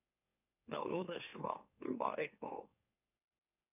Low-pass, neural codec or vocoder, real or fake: 3.6 kHz; autoencoder, 44.1 kHz, a latent of 192 numbers a frame, MeloTTS; fake